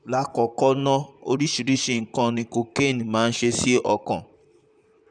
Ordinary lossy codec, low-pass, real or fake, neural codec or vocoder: none; 9.9 kHz; fake; vocoder, 44.1 kHz, 128 mel bands, Pupu-Vocoder